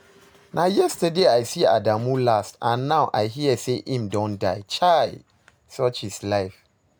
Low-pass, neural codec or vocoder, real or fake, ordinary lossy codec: none; none; real; none